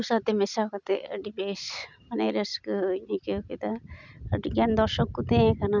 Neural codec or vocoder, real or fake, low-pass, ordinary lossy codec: none; real; 7.2 kHz; none